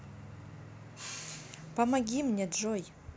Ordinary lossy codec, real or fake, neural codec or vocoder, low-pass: none; real; none; none